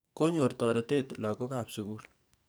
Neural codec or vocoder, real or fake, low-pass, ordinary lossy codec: codec, 44.1 kHz, 2.6 kbps, SNAC; fake; none; none